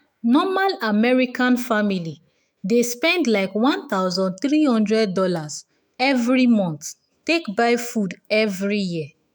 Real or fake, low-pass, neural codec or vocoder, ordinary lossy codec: fake; none; autoencoder, 48 kHz, 128 numbers a frame, DAC-VAE, trained on Japanese speech; none